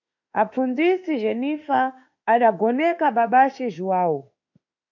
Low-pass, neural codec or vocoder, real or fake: 7.2 kHz; autoencoder, 48 kHz, 32 numbers a frame, DAC-VAE, trained on Japanese speech; fake